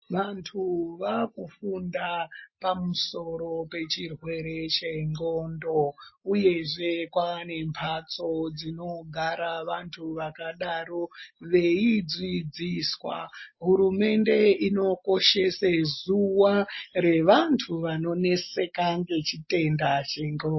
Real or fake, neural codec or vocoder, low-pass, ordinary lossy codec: real; none; 7.2 kHz; MP3, 24 kbps